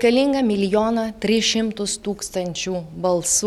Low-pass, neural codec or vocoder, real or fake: 19.8 kHz; none; real